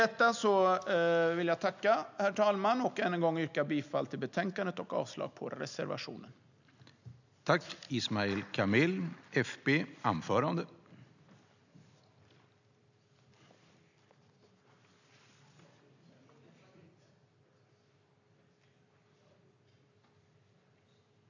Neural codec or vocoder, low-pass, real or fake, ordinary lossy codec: none; 7.2 kHz; real; none